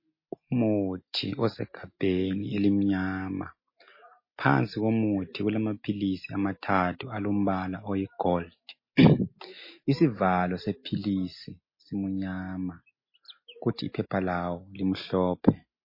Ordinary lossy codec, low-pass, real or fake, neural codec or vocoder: MP3, 24 kbps; 5.4 kHz; real; none